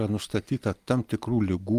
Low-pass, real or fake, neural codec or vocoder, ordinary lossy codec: 14.4 kHz; real; none; Opus, 32 kbps